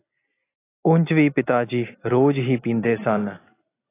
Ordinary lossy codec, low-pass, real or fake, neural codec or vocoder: AAC, 16 kbps; 3.6 kHz; real; none